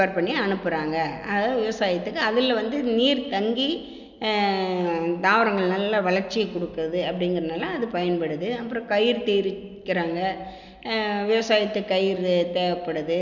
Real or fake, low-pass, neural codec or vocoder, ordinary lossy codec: real; 7.2 kHz; none; none